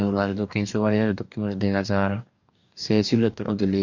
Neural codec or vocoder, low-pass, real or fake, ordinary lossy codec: codec, 44.1 kHz, 2.6 kbps, DAC; 7.2 kHz; fake; none